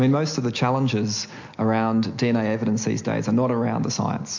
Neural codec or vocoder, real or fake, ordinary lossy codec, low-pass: none; real; MP3, 48 kbps; 7.2 kHz